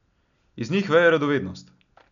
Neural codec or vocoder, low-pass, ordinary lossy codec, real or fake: none; 7.2 kHz; none; real